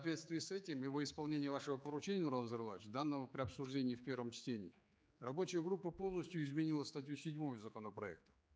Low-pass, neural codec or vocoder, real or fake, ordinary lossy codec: none; codec, 16 kHz, 4 kbps, X-Codec, HuBERT features, trained on general audio; fake; none